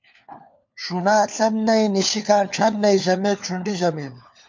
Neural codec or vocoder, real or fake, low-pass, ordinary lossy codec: codec, 16 kHz, 4 kbps, FunCodec, trained on LibriTTS, 50 frames a second; fake; 7.2 kHz; MP3, 48 kbps